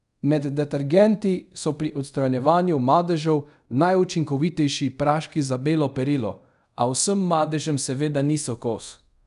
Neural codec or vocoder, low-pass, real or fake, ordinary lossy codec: codec, 24 kHz, 0.5 kbps, DualCodec; 10.8 kHz; fake; none